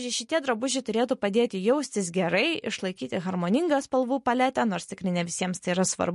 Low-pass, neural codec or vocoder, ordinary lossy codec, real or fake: 14.4 kHz; none; MP3, 48 kbps; real